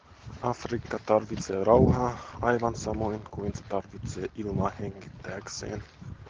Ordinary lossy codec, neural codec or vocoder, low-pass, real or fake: Opus, 16 kbps; none; 7.2 kHz; real